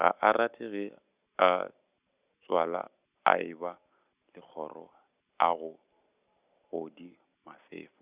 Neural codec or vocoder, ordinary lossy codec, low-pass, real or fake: none; Opus, 64 kbps; 3.6 kHz; real